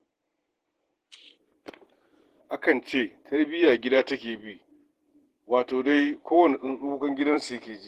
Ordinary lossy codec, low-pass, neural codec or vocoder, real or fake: Opus, 16 kbps; 14.4 kHz; vocoder, 48 kHz, 128 mel bands, Vocos; fake